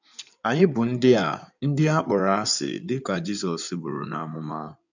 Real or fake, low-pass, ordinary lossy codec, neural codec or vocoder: fake; 7.2 kHz; none; codec, 16 kHz in and 24 kHz out, 2.2 kbps, FireRedTTS-2 codec